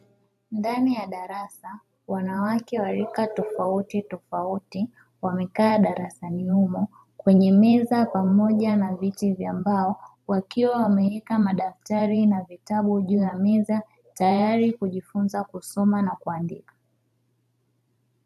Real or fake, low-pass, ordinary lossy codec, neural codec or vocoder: fake; 14.4 kHz; AAC, 96 kbps; vocoder, 44.1 kHz, 128 mel bands every 512 samples, BigVGAN v2